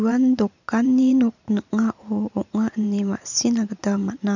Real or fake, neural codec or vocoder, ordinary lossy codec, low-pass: fake; vocoder, 44.1 kHz, 128 mel bands every 512 samples, BigVGAN v2; none; 7.2 kHz